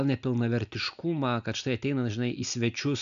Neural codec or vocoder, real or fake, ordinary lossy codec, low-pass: none; real; MP3, 96 kbps; 7.2 kHz